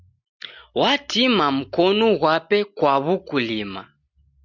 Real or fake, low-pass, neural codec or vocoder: real; 7.2 kHz; none